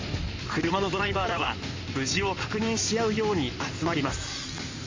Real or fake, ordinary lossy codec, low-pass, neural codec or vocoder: fake; MP3, 64 kbps; 7.2 kHz; codec, 16 kHz in and 24 kHz out, 2.2 kbps, FireRedTTS-2 codec